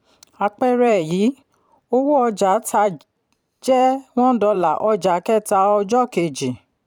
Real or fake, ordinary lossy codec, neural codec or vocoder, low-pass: fake; none; vocoder, 44.1 kHz, 128 mel bands every 512 samples, BigVGAN v2; 19.8 kHz